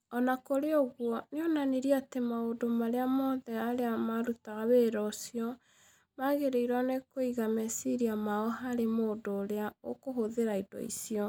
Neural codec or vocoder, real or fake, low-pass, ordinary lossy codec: none; real; none; none